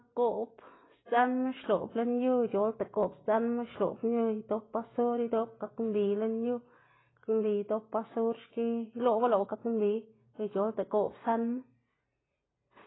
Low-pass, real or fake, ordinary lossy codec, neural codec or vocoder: 7.2 kHz; real; AAC, 16 kbps; none